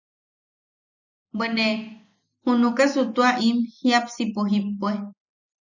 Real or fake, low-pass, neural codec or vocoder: real; 7.2 kHz; none